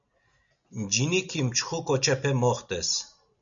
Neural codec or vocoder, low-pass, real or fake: none; 7.2 kHz; real